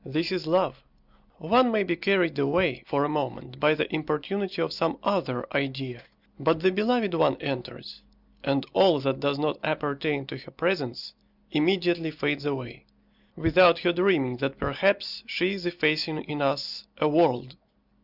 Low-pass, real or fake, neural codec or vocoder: 5.4 kHz; real; none